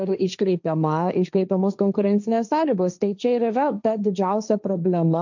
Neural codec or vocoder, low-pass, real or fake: codec, 16 kHz, 1.1 kbps, Voila-Tokenizer; 7.2 kHz; fake